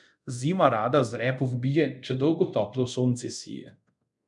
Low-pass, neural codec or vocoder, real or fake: 10.8 kHz; codec, 24 kHz, 0.5 kbps, DualCodec; fake